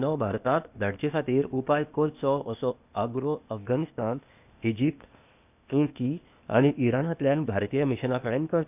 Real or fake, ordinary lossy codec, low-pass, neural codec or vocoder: fake; none; 3.6 kHz; codec, 16 kHz, 0.8 kbps, ZipCodec